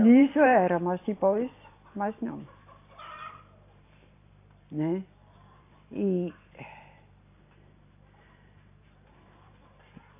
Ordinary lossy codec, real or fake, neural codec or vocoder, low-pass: AAC, 24 kbps; fake; vocoder, 44.1 kHz, 128 mel bands every 512 samples, BigVGAN v2; 3.6 kHz